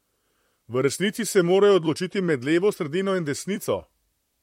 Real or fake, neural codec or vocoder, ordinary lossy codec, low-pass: fake; vocoder, 44.1 kHz, 128 mel bands, Pupu-Vocoder; MP3, 64 kbps; 19.8 kHz